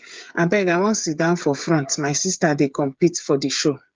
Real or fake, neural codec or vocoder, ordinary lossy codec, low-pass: fake; codec, 16 kHz, 8 kbps, FreqCodec, smaller model; Opus, 24 kbps; 7.2 kHz